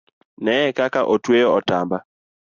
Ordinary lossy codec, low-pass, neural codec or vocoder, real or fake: Opus, 64 kbps; 7.2 kHz; none; real